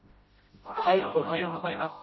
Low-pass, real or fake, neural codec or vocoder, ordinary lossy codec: 7.2 kHz; fake; codec, 16 kHz, 0.5 kbps, FreqCodec, smaller model; MP3, 24 kbps